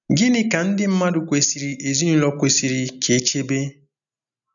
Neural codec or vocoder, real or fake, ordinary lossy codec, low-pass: none; real; none; 7.2 kHz